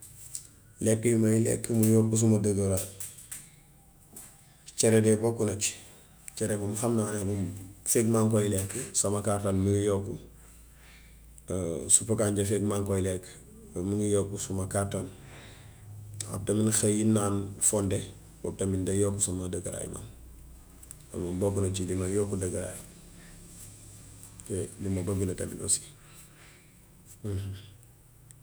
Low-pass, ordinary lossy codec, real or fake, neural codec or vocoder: none; none; fake; autoencoder, 48 kHz, 128 numbers a frame, DAC-VAE, trained on Japanese speech